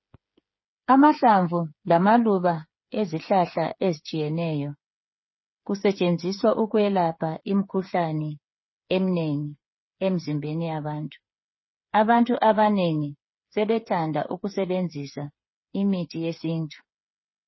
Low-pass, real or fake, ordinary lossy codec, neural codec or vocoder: 7.2 kHz; fake; MP3, 24 kbps; codec, 16 kHz, 8 kbps, FreqCodec, smaller model